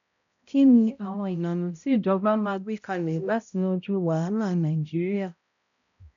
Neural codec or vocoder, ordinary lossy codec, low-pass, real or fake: codec, 16 kHz, 0.5 kbps, X-Codec, HuBERT features, trained on balanced general audio; none; 7.2 kHz; fake